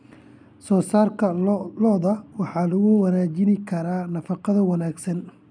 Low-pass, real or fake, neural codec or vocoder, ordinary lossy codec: 9.9 kHz; real; none; none